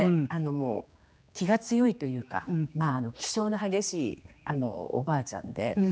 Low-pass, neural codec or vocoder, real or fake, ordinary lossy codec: none; codec, 16 kHz, 2 kbps, X-Codec, HuBERT features, trained on general audio; fake; none